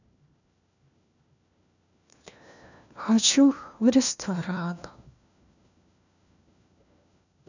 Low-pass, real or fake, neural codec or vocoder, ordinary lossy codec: 7.2 kHz; fake; codec, 16 kHz, 1 kbps, FunCodec, trained on LibriTTS, 50 frames a second; none